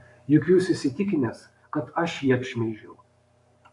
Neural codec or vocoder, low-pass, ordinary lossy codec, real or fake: codec, 44.1 kHz, 7.8 kbps, Pupu-Codec; 10.8 kHz; MP3, 64 kbps; fake